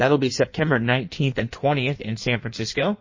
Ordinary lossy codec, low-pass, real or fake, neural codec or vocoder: MP3, 32 kbps; 7.2 kHz; fake; codec, 16 kHz in and 24 kHz out, 1.1 kbps, FireRedTTS-2 codec